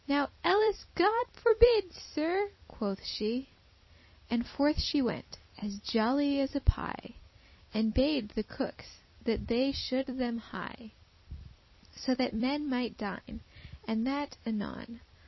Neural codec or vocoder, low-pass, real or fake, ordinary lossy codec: vocoder, 44.1 kHz, 128 mel bands every 256 samples, BigVGAN v2; 7.2 kHz; fake; MP3, 24 kbps